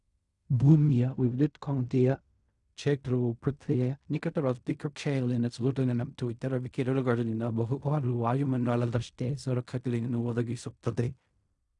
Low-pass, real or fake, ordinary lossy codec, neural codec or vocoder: 10.8 kHz; fake; none; codec, 16 kHz in and 24 kHz out, 0.4 kbps, LongCat-Audio-Codec, fine tuned four codebook decoder